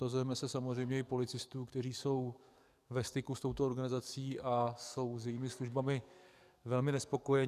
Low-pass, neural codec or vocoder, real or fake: 14.4 kHz; codec, 44.1 kHz, 7.8 kbps, DAC; fake